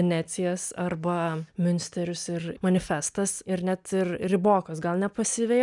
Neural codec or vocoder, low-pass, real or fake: none; 10.8 kHz; real